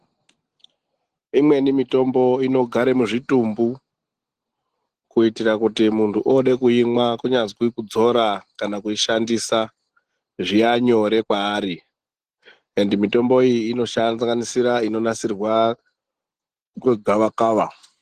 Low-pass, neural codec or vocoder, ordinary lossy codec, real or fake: 14.4 kHz; none; Opus, 16 kbps; real